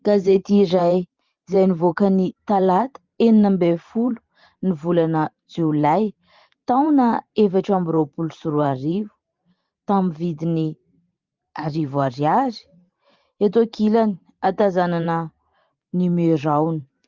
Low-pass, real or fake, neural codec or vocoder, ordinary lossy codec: 7.2 kHz; fake; vocoder, 44.1 kHz, 128 mel bands every 512 samples, BigVGAN v2; Opus, 24 kbps